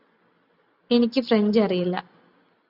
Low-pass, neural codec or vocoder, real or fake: 5.4 kHz; none; real